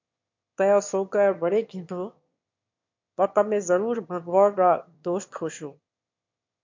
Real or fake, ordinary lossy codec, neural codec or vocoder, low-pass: fake; MP3, 48 kbps; autoencoder, 22.05 kHz, a latent of 192 numbers a frame, VITS, trained on one speaker; 7.2 kHz